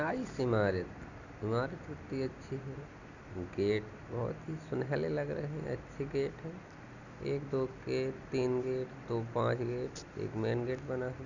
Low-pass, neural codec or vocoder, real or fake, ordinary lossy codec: 7.2 kHz; none; real; none